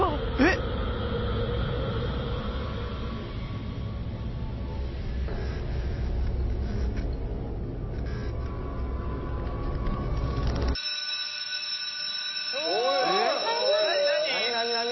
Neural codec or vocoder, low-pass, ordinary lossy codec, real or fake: none; 7.2 kHz; MP3, 24 kbps; real